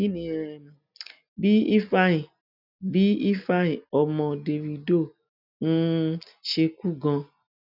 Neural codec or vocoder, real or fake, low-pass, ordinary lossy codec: none; real; 5.4 kHz; none